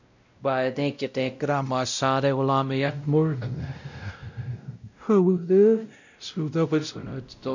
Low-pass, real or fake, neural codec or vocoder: 7.2 kHz; fake; codec, 16 kHz, 0.5 kbps, X-Codec, WavLM features, trained on Multilingual LibriSpeech